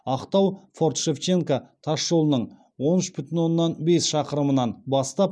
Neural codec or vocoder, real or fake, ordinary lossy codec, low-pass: none; real; none; none